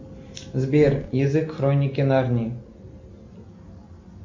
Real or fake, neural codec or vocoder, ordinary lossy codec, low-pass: real; none; MP3, 48 kbps; 7.2 kHz